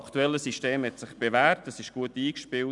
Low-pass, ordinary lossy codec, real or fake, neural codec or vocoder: 10.8 kHz; none; real; none